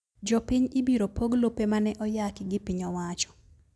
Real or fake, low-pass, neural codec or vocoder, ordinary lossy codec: real; none; none; none